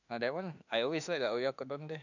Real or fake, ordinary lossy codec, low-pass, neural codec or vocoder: fake; none; 7.2 kHz; autoencoder, 48 kHz, 32 numbers a frame, DAC-VAE, trained on Japanese speech